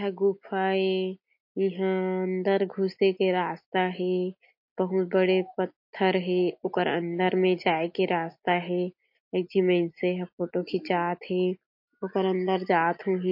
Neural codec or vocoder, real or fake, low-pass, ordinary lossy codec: none; real; 5.4 kHz; MP3, 32 kbps